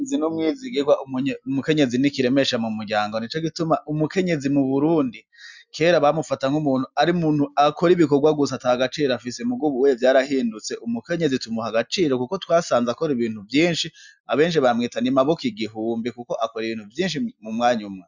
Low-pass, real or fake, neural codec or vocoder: 7.2 kHz; real; none